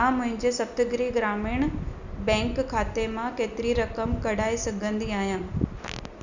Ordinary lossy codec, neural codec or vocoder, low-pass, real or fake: none; none; 7.2 kHz; real